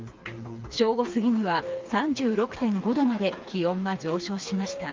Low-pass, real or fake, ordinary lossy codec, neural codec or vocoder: 7.2 kHz; fake; Opus, 24 kbps; codec, 16 kHz, 4 kbps, FreqCodec, smaller model